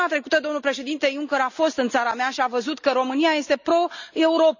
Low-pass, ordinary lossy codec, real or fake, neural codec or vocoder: 7.2 kHz; none; real; none